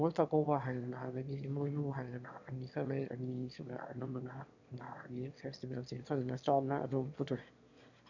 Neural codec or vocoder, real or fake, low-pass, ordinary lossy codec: autoencoder, 22.05 kHz, a latent of 192 numbers a frame, VITS, trained on one speaker; fake; 7.2 kHz; none